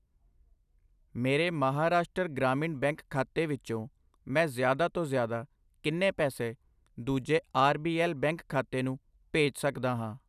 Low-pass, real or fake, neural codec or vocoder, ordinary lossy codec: 14.4 kHz; real; none; none